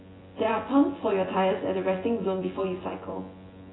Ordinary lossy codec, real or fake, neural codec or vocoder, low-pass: AAC, 16 kbps; fake; vocoder, 24 kHz, 100 mel bands, Vocos; 7.2 kHz